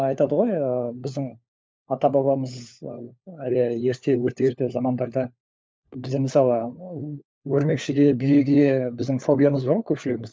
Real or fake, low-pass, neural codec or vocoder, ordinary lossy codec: fake; none; codec, 16 kHz, 4 kbps, FunCodec, trained on LibriTTS, 50 frames a second; none